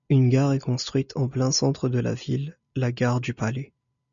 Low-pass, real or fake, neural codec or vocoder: 7.2 kHz; real; none